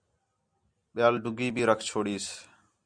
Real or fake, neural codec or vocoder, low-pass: real; none; 9.9 kHz